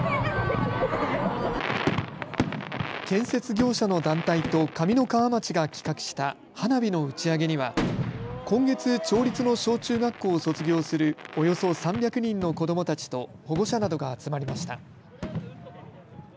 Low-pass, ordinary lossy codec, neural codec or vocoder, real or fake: none; none; none; real